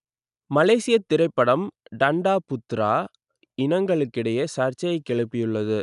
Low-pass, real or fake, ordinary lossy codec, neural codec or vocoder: 10.8 kHz; real; none; none